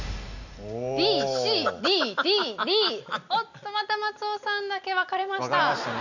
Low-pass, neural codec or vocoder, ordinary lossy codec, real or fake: 7.2 kHz; none; none; real